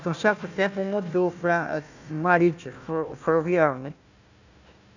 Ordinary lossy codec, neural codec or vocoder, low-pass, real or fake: none; codec, 16 kHz, 1 kbps, FunCodec, trained on Chinese and English, 50 frames a second; 7.2 kHz; fake